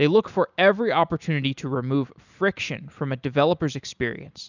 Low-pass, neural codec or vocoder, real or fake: 7.2 kHz; none; real